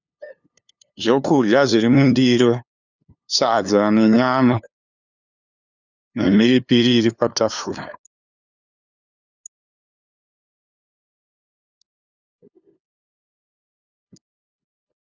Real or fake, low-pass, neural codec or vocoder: fake; 7.2 kHz; codec, 16 kHz, 2 kbps, FunCodec, trained on LibriTTS, 25 frames a second